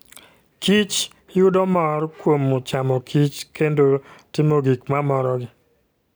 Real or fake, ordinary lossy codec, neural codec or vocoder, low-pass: real; none; none; none